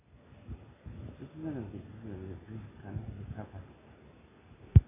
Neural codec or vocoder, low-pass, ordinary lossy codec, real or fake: none; 3.6 kHz; AAC, 16 kbps; real